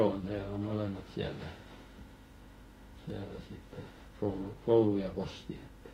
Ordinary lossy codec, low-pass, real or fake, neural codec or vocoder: AAC, 48 kbps; 19.8 kHz; fake; autoencoder, 48 kHz, 32 numbers a frame, DAC-VAE, trained on Japanese speech